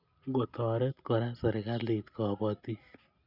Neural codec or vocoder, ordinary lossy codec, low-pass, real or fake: none; none; 5.4 kHz; real